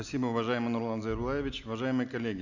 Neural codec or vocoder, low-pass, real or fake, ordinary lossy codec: none; 7.2 kHz; real; none